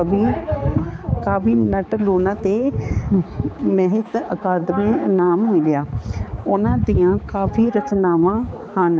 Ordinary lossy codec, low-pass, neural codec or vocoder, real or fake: none; none; codec, 16 kHz, 4 kbps, X-Codec, HuBERT features, trained on balanced general audio; fake